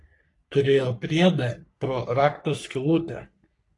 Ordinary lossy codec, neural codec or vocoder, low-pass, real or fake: AAC, 48 kbps; codec, 44.1 kHz, 3.4 kbps, Pupu-Codec; 10.8 kHz; fake